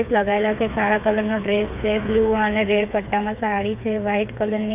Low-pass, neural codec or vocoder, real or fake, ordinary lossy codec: 3.6 kHz; codec, 16 kHz, 4 kbps, FreqCodec, smaller model; fake; none